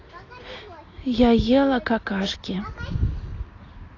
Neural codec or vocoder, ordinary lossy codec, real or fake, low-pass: none; AAC, 32 kbps; real; 7.2 kHz